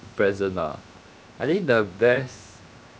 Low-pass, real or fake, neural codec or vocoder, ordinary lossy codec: none; fake; codec, 16 kHz, 0.7 kbps, FocalCodec; none